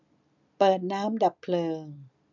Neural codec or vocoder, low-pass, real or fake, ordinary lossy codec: none; 7.2 kHz; real; none